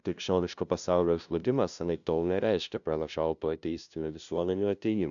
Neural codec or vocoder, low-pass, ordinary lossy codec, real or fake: codec, 16 kHz, 0.5 kbps, FunCodec, trained on LibriTTS, 25 frames a second; 7.2 kHz; MP3, 96 kbps; fake